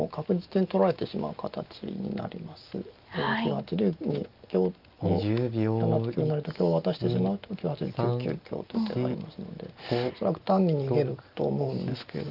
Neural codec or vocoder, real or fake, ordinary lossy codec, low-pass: none; real; Opus, 32 kbps; 5.4 kHz